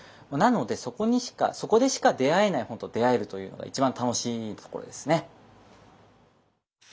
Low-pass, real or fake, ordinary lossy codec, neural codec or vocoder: none; real; none; none